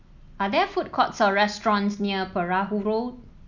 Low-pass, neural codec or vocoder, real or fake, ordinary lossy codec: 7.2 kHz; none; real; none